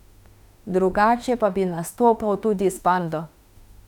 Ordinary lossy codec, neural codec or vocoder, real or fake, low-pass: none; autoencoder, 48 kHz, 32 numbers a frame, DAC-VAE, trained on Japanese speech; fake; 19.8 kHz